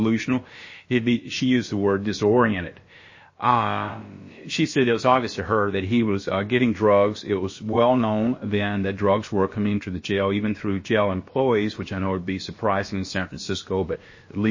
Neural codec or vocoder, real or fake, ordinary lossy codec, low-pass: codec, 16 kHz, about 1 kbps, DyCAST, with the encoder's durations; fake; MP3, 32 kbps; 7.2 kHz